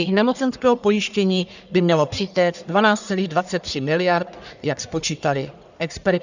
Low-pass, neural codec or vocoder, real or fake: 7.2 kHz; codec, 44.1 kHz, 1.7 kbps, Pupu-Codec; fake